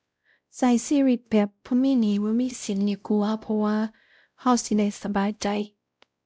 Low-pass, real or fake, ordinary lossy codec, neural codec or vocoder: none; fake; none; codec, 16 kHz, 0.5 kbps, X-Codec, WavLM features, trained on Multilingual LibriSpeech